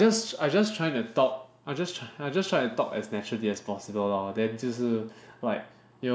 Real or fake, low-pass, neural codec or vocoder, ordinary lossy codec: real; none; none; none